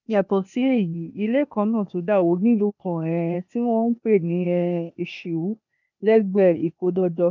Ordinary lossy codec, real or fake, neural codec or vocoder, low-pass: none; fake; codec, 16 kHz, 0.8 kbps, ZipCodec; 7.2 kHz